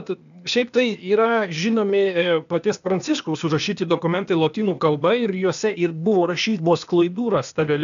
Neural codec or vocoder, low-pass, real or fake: codec, 16 kHz, 0.8 kbps, ZipCodec; 7.2 kHz; fake